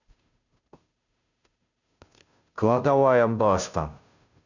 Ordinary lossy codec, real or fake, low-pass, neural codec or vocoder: none; fake; 7.2 kHz; codec, 16 kHz, 0.5 kbps, FunCodec, trained on Chinese and English, 25 frames a second